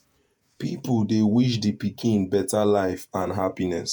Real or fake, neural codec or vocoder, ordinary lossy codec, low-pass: fake; vocoder, 48 kHz, 128 mel bands, Vocos; none; none